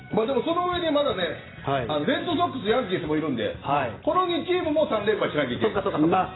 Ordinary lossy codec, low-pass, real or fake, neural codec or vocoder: AAC, 16 kbps; 7.2 kHz; real; none